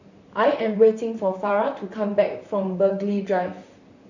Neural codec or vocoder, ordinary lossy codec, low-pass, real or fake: vocoder, 44.1 kHz, 128 mel bands, Pupu-Vocoder; none; 7.2 kHz; fake